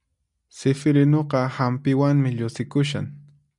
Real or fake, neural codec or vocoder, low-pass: real; none; 10.8 kHz